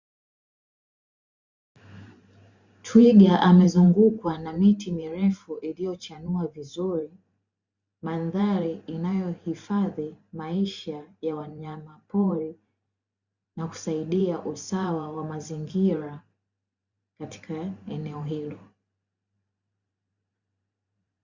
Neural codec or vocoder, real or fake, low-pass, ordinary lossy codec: vocoder, 44.1 kHz, 128 mel bands every 512 samples, BigVGAN v2; fake; 7.2 kHz; Opus, 64 kbps